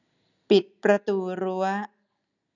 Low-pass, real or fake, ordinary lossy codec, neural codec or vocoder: 7.2 kHz; real; none; none